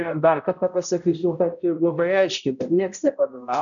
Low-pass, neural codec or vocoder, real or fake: 7.2 kHz; codec, 16 kHz, 0.5 kbps, X-Codec, HuBERT features, trained on balanced general audio; fake